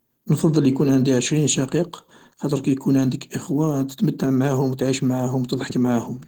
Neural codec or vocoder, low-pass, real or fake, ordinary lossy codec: none; 19.8 kHz; real; Opus, 16 kbps